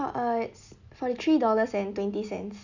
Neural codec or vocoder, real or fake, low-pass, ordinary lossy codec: none; real; 7.2 kHz; none